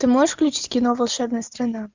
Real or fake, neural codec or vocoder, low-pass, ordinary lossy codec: real; none; 7.2 kHz; Opus, 64 kbps